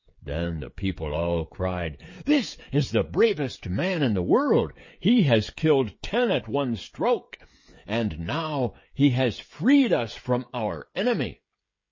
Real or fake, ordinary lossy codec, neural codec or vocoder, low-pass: fake; MP3, 32 kbps; codec, 16 kHz, 16 kbps, FreqCodec, smaller model; 7.2 kHz